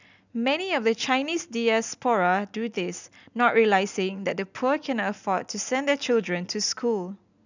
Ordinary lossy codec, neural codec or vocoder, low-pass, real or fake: none; none; 7.2 kHz; real